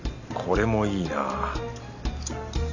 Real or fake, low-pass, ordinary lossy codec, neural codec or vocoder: real; 7.2 kHz; none; none